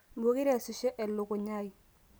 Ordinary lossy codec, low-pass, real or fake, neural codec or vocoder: none; none; real; none